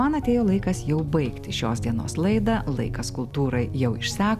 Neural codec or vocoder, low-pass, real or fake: none; 14.4 kHz; real